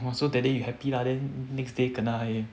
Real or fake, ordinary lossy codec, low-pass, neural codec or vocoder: real; none; none; none